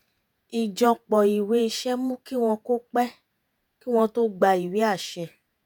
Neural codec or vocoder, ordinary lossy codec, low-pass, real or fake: vocoder, 48 kHz, 128 mel bands, Vocos; none; none; fake